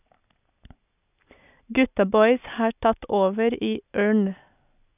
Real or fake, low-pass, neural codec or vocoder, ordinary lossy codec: real; 3.6 kHz; none; none